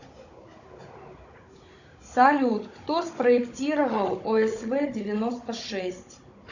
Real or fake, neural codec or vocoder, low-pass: fake; codec, 16 kHz, 16 kbps, FunCodec, trained on Chinese and English, 50 frames a second; 7.2 kHz